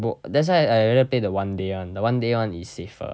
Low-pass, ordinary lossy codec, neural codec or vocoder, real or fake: none; none; none; real